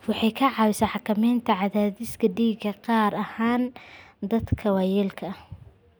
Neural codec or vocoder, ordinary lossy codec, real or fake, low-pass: none; none; real; none